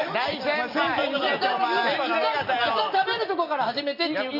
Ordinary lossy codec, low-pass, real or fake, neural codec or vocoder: none; 5.4 kHz; real; none